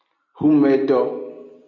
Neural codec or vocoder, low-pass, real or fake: none; 7.2 kHz; real